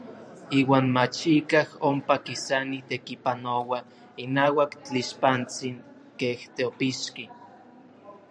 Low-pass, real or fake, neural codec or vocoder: 9.9 kHz; fake; vocoder, 44.1 kHz, 128 mel bands every 512 samples, BigVGAN v2